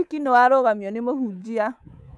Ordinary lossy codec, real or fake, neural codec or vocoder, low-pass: none; fake; codec, 24 kHz, 3.1 kbps, DualCodec; none